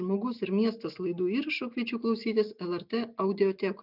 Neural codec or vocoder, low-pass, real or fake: none; 5.4 kHz; real